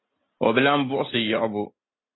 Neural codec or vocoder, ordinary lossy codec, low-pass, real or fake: none; AAC, 16 kbps; 7.2 kHz; real